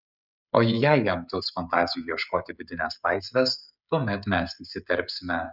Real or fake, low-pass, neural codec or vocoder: fake; 5.4 kHz; codec, 16 kHz, 16 kbps, FreqCodec, smaller model